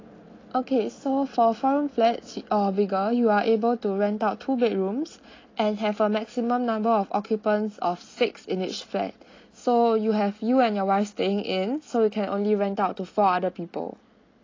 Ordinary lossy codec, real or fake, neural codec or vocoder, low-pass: AAC, 32 kbps; real; none; 7.2 kHz